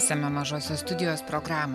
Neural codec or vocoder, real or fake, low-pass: none; real; 14.4 kHz